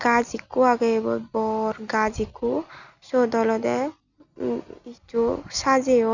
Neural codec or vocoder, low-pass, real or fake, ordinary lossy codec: none; 7.2 kHz; real; none